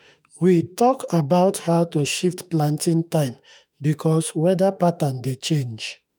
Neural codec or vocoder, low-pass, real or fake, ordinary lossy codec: autoencoder, 48 kHz, 32 numbers a frame, DAC-VAE, trained on Japanese speech; none; fake; none